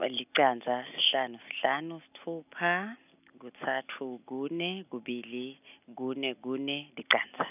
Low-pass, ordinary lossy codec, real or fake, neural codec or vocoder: 3.6 kHz; none; real; none